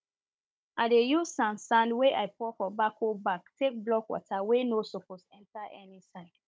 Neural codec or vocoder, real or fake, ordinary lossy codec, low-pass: codec, 16 kHz, 16 kbps, FunCodec, trained on Chinese and English, 50 frames a second; fake; none; none